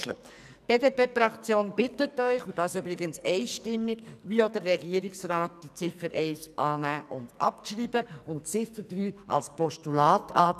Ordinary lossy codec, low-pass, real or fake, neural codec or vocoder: none; 14.4 kHz; fake; codec, 44.1 kHz, 2.6 kbps, SNAC